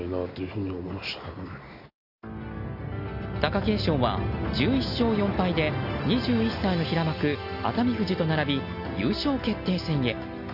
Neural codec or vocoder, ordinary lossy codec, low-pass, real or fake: none; Opus, 64 kbps; 5.4 kHz; real